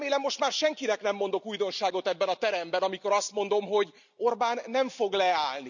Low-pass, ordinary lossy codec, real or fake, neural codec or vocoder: 7.2 kHz; none; real; none